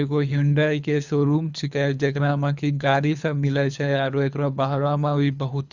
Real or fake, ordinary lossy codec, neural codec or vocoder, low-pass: fake; Opus, 64 kbps; codec, 24 kHz, 3 kbps, HILCodec; 7.2 kHz